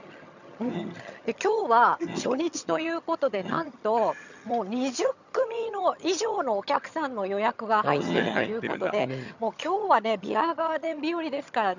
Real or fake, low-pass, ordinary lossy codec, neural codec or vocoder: fake; 7.2 kHz; none; vocoder, 22.05 kHz, 80 mel bands, HiFi-GAN